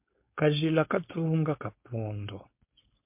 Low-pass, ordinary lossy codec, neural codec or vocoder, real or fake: 3.6 kHz; MP3, 32 kbps; codec, 16 kHz, 4.8 kbps, FACodec; fake